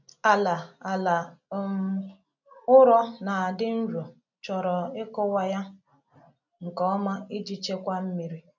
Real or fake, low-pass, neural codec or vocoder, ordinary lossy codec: real; 7.2 kHz; none; none